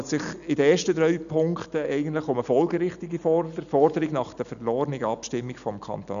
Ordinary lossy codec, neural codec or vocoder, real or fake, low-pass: none; none; real; 7.2 kHz